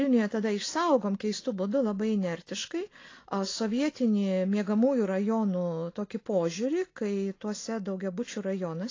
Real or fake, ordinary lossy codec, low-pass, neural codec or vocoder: real; AAC, 32 kbps; 7.2 kHz; none